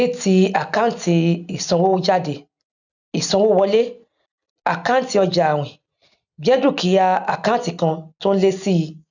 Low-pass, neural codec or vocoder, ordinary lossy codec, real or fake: 7.2 kHz; none; none; real